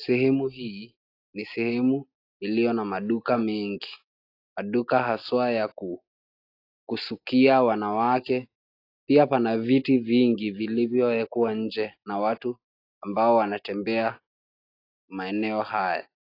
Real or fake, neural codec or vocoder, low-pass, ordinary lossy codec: real; none; 5.4 kHz; AAC, 32 kbps